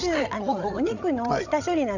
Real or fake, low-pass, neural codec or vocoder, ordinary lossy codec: fake; 7.2 kHz; codec, 16 kHz, 16 kbps, FreqCodec, larger model; none